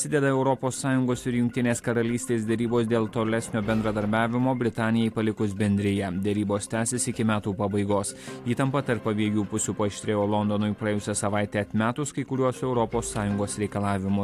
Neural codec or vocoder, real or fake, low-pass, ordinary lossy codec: none; real; 14.4 kHz; AAC, 64 kbps